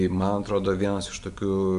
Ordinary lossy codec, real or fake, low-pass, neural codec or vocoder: Opus, 64 kbps; real; 10.8 kHz; none